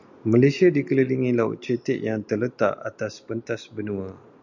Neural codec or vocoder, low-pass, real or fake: none; 7.2 kHz; real